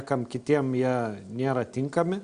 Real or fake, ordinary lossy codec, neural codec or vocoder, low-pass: real; Opus, 64 kbps; none; 9.9 kHz